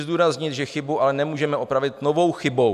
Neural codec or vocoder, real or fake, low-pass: none; real; 14.4 kHz